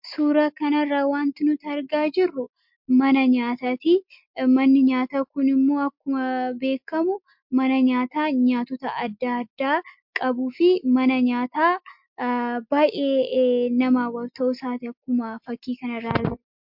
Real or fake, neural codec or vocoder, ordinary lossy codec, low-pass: real; none; MP3, 48 kbps; 5.4 kHz